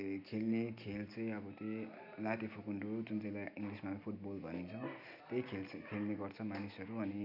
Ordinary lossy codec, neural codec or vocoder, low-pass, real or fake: none; none; 5.4 kHz; real